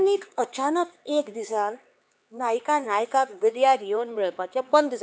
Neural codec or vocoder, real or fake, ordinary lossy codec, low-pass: codec, 16 kHz, 4 kbps, X-Codec, HuBERT features, trained on LibriSpeech; fake; none; none